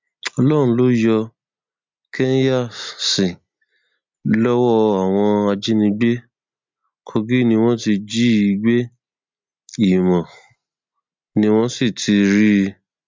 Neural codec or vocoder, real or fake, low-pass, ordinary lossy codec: none; real; 7.2 kHz; MP3, 64 kbps